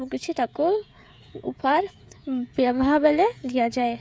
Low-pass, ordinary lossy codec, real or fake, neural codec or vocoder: none; none; fake; codec, 16 kHz, 16 kbps, FreqCodec, smaller model